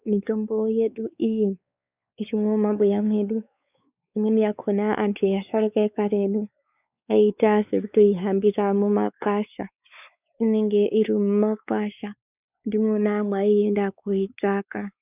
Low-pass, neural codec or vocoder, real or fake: 3.6 kHz; codec, 16 kHz, 4 kbps, X-Codec, WavLM features, trained on Multilingual LibriSpeech; fake